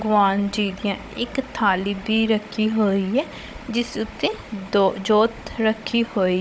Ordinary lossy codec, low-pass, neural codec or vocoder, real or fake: none; none; codec, 16 kHz, 16 kbps, FunCodec, trained on Chinese and English, 50 frames a second; fake